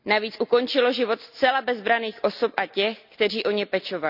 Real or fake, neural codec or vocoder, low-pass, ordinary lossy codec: real; none; 5.4 kHz; none